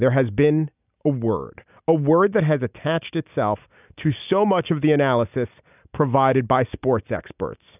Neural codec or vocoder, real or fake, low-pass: none; real; 3.6 kHz